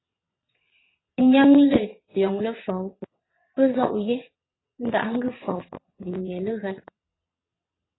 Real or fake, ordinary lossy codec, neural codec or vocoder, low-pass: fake; AAC, 16 kbps; vocoder, 22.05 kHz, 80 mel bands, WaveNeXt; 7.2 kHz